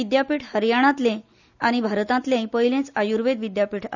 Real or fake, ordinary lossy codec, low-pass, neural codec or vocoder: real; none; 7.2 kHz; none